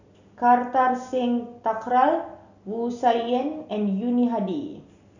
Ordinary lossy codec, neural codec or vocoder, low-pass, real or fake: none; none; 7.2 kHz; real